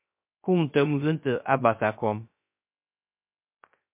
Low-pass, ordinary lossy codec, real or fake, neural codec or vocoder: 3.6 kHz; MP3, 32 kbps; fake; codec, 16 kHz, 0.3 kbps, FocalCodec